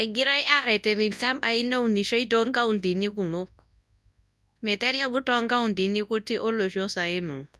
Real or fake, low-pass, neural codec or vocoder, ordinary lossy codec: fake; none; codec, 24 kHz, 0.9 kbps, WavTokenizer, large speech release; none